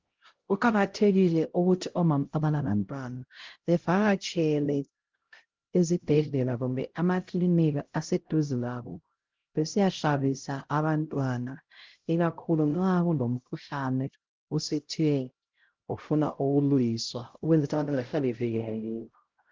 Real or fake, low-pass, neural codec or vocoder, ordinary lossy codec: fake; 7.2 kHz; codec, 16 kHz, 0.5 kbps, X-Codec, HuBERT features, trained on LibriSpeech; Opus, 16 kbps